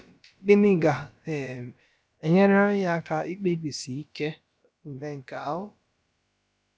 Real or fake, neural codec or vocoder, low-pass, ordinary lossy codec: fake; codec, 16 kHz, about 1 kbps, DyCAST, with the encoder's durations; none; none